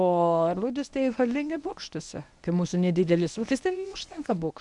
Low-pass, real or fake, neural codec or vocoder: 10.8 kHz; fake; codec, 24 kHz, 0.9 kbps, WavTokenizer, medium speech release version 1